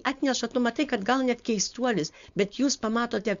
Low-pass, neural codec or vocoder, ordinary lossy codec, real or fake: 7.2 kHz; codec, 16 kHz, 4.8 kbps, FACodec; Opus, 64 kbps; fake